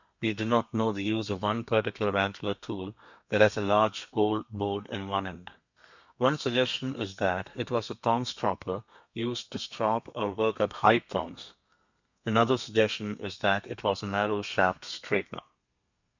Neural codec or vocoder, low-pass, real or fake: codec, 32 kHz, 1.9 kbps, SNAC; 7.2 kHz; fake